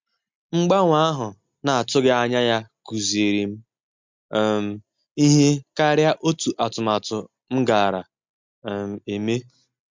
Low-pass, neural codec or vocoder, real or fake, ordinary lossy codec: 7.2 kHz; none; real; MP3, 64 kbps